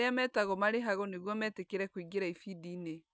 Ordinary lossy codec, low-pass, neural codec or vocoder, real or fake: none; none; none; real